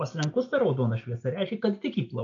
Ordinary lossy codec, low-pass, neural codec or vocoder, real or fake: MP3, 48 kbps; 7.2 kHz; none; real